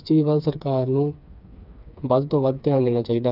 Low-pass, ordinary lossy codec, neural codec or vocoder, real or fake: 5.4 kHz; none; codec, 16 kHz, 4 kbps, FreqCodec, smaller model; fake